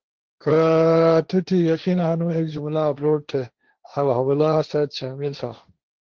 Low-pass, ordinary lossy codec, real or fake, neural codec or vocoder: 7.2 kHz; Opus, 16 kbps; fake; codec, 16 kHz, 1.1 kbps, Voila-Tokenizer